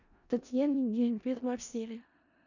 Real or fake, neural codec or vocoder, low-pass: fake; codec, 16 kHz in and 24 kHz out, 0.4 kbps, LongCat-Audio-Codec, four codebook decoder; 7.2 kHz